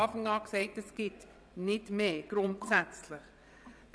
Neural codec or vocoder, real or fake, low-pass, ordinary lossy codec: vocoder, 22.05 kHz, 80 mel bands, WaveNeXt; fake; none; none